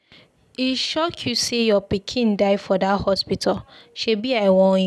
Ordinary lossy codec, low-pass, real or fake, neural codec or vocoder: none; none; real; none